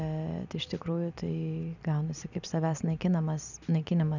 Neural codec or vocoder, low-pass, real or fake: none; 7.2 kHz; real